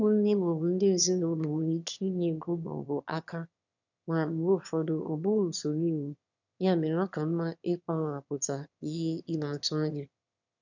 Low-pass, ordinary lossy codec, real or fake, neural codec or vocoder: 7.2 kHz; none; fake; autoencoder, 22.05 kHz, a latent of 192 numbers a frame, VITS, trained on one speaker